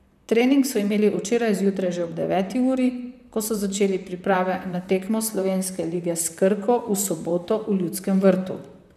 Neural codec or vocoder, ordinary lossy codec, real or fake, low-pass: vocoder, 44.1 kHz, 128 mel bands, Pupu-Vocoder; none; fake; 14.4 kHz